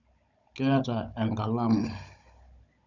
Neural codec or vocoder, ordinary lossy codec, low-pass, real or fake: codec, 16 kHz, 16 kbps, FunCodec, trained on Chinese and English, 50 frames a second; none; 7.2 kHz; fake